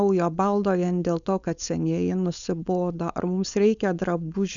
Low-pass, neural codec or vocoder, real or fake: 7.2 kHz; codec, 16 kHz, 4.8 kbps, FACodec; fake